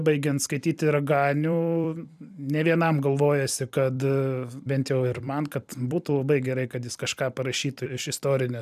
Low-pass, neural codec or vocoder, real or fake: 14.4 kHz; vocoder, 44.1 kHz, 128 mel bands every 256 samples, BigVGAN v2; fake